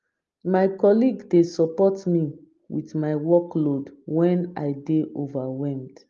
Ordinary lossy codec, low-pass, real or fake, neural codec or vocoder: Opus, 32 kbps; 7.2 kHz; real; none